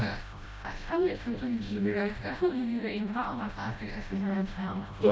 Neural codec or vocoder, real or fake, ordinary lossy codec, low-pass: codec, 16 kHz, 0.5 kbps, FreqCodec, smaller model; fake; none; none